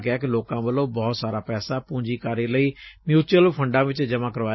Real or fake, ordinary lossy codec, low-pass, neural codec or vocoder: real; MP3, 24 kbps; 7.2 kHz; none